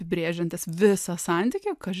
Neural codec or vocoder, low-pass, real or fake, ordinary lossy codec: none; 14.4 kHz; real; AAC, 96 kbps